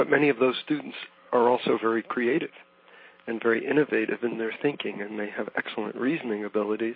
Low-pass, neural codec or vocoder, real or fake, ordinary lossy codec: 5.4 kHz; none; real; MP3, 24 kbps